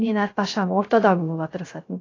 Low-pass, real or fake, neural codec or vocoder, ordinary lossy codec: 7.2 kHz; fake; codec, 16 kHz, 0.3 kbps, FocalCodec; AAC, 32 kbps